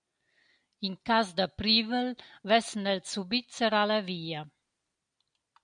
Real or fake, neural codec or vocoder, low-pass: real; none; 9.9 kHz